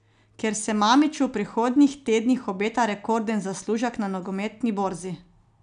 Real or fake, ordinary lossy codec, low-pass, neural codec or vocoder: real; none; 9.9 kHz; none